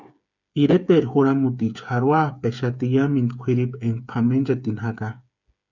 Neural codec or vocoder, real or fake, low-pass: codec, 16 kHz, 8 kbps, FreqCodec, smaller model; fake; 7.2 kHz